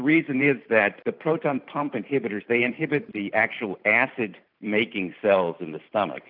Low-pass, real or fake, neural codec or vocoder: 5.4 kHz; fake; vocoder, 44.1 kHz, 128 mel bands every 512 samples, BigVGAN v2